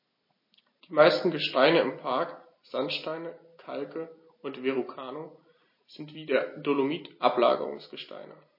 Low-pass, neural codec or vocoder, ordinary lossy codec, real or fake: 5.4 kHz; none; MP3, 24 kbps; real